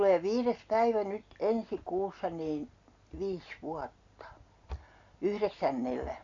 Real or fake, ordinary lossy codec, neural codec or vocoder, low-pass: real; AAC, 64 kbps; none; 7.2 kHz